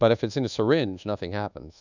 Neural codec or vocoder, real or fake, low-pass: codec, 24 kHz, 1.2 kbps, DualCodec; fake; 7.2 kHz